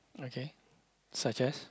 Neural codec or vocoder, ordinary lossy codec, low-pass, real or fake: none; none; none; real